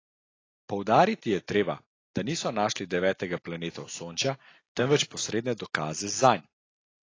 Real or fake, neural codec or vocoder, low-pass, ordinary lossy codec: real; none; 7.2 kHz; AAC, 32 kbps